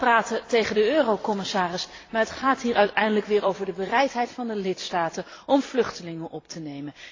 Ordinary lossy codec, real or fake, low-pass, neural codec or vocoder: AAC, 32 kbps; fake; 7.2 kHz; vocoder, 44.1 kHz, 128 mel bands every 256 samples, BigVGAN v2